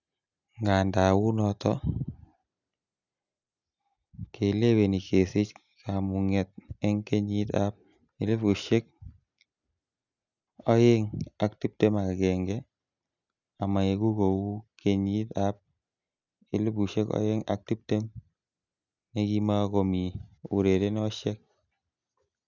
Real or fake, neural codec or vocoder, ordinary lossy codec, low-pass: real; none; none; 7.2 kHz